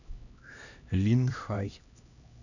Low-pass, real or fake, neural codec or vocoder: 7.2 kHz; fake; codec, 16 kHz, 1 kbps, X-Codec, HuBERT features, trained on LibriSpeech